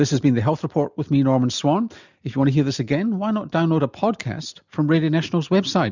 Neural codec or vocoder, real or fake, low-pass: none; real; 7.2 kHz